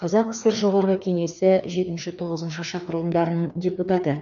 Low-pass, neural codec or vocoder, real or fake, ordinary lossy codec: 7.2 kHz; codec, 16 kHz, 2 kbps, FreqCodec, larger model; fake; none